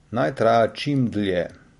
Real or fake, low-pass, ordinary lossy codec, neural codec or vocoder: real; 14.4 kHz; MP3, 48 kbps; none